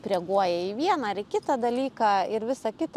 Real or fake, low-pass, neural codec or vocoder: real; 14.4 kHz; none